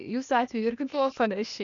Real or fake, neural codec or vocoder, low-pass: fake; codec, 16 kHz, 0.8 kbps, ZipCodec; 7.2 kHz